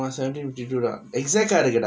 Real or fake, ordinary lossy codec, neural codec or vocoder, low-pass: real; none; none; none